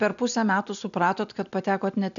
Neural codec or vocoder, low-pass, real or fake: none; 7.2 kHz; real